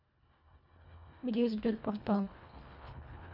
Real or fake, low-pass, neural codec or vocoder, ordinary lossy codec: fake; 5.4 kHz; codec, 24 kHz, 1.5 kbps, HILCodec; none